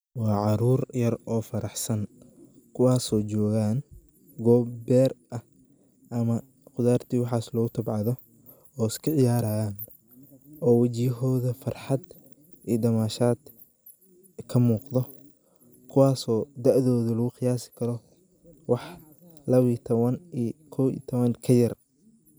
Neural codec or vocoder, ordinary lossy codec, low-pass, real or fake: none; none; none; real